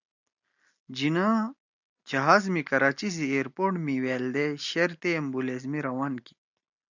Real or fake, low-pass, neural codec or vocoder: real; 7.2 kHz; none